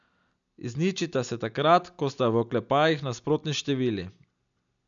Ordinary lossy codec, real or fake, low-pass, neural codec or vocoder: MP3, 96 kbps; real; 7.2 kHz; none